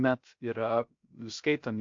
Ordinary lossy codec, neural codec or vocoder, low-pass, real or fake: MP3, 48 kbps; codec, 16 kHz, 0.3 kbps, FocalCodec; 7.2 kHz; fake